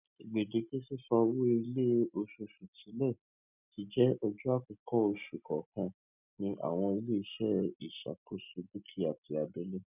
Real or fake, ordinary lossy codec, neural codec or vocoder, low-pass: real; none; none; 3.6 kHz